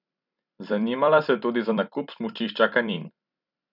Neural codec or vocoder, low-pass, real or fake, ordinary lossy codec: vocoder, 44.1 kHz, 128 mel bands every 256 samples, BigVGAN v2; 5.4 kHz; fake; none